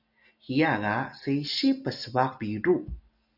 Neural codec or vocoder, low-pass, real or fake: none; 5.4 kHz; real